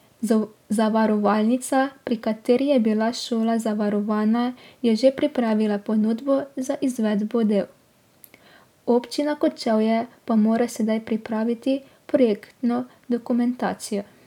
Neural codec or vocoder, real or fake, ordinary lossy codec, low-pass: none; real; none; 19.8 kHz